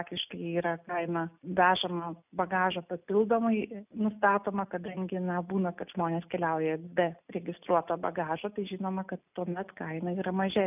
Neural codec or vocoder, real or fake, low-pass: none; real; 3.6 kHz